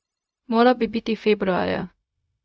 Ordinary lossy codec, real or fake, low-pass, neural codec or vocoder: none; fake; none; codec, 16 kHz, 0.4 kbps, LongCat-Audio-Codec